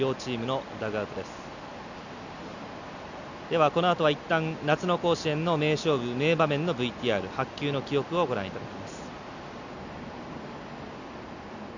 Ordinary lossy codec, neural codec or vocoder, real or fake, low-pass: none; none; real; 7.2 kHz